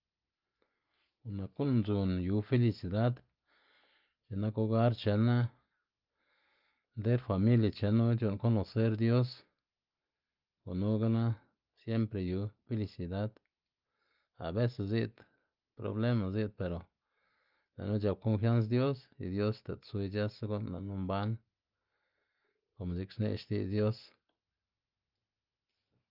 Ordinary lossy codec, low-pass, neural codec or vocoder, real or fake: Opus, 24 kbps; 5.4 kHz; none; real